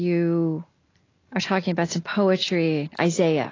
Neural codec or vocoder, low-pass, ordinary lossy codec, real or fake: none; 7.2 kHz; AAC, 32 kbps; real